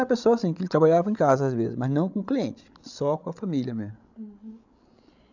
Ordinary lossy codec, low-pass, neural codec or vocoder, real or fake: none; 7.2 kHz; codec, 16 kHz, 16 kbps, FreqCodec, larger model; fake